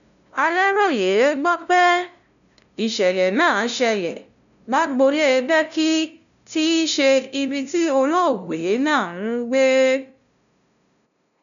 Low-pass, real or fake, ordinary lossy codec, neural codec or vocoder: 7.2 kHz; fake; none; codec, 16 kHz, 0.5 kbps, FunCodec, trained on LibriTTS, 25 frames a second